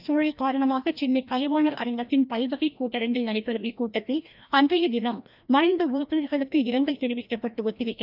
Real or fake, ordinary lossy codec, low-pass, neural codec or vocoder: fake; none; 5.4 kHz; codec, 16 kHz, 1 kbps, FreqCodec, larger model